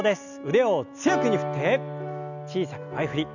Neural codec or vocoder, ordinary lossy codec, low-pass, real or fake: none; none; 7.2 kHz; real